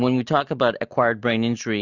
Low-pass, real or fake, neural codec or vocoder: 7.2 kHz; real; none